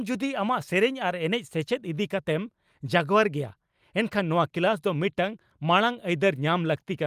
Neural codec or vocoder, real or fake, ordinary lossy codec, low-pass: none; real; Opus, 32 kbps; 14.4 kHz